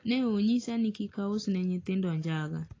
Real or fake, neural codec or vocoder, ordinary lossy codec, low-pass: real; none; AAC, 32 kbps; 7.2 kHz